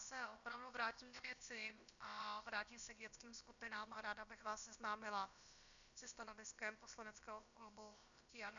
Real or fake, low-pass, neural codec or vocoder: fake; 7.2 kHz; codec, 16 kHz, about 1 kbps, DyCAST, with the encoder's durations